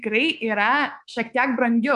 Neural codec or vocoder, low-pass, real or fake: codec, 24 kHz, 3.1 kbps, DualCodec; 10.8 kHz; fake